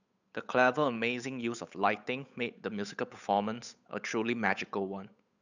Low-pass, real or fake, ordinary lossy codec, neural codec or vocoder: 7.2 kHz; fake; none; codec, 16 kHz, 8 kbps, FunCodec, trained on Chinese and English, 25 frames a second